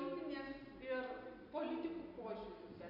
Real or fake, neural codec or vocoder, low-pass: real; none; 5.4 kHz